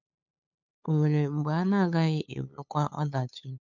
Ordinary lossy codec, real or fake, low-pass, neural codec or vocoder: none; fake; 7.2 kHz; codec, 16 kHz, 8 kbps, FunCodec, trained on LibriTTS, 25 frames a second